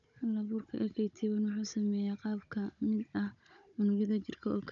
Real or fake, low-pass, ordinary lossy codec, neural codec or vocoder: fake; 7.2 kHz; none; codec, 16 kHz, 16 kbps, FunCodec, trained on Chinese and English, 50 frames a second